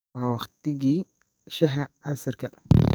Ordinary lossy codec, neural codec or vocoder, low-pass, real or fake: none; codec, 44.1 kHz, 2.6 kbps, SNAC; none; fake